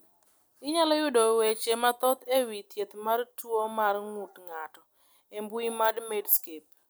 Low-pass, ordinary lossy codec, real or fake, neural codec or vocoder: none; none; real; none